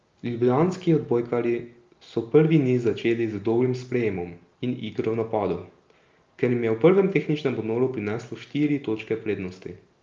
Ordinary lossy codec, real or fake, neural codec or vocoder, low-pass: Opus, 32 kbps; real; none; 7.2 kHz